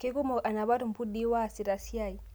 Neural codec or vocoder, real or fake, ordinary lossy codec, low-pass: none; real; none; none